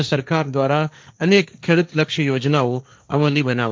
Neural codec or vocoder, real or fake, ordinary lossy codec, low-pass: codec, 16 kHz, 1.1 kbps, Voila-Tokenizer; fake; none; none